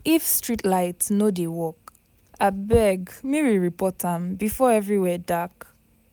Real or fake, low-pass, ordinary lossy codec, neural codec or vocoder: real; none; none; none